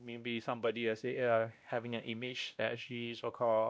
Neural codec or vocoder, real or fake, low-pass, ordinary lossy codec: codec, 16 kHz, 1 kbps, X-Codec, WavLM features, trained on Multilingual LibriSpeech; fake; none; none